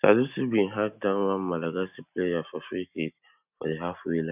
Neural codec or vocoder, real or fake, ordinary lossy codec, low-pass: none; real; none; 3.6 kHz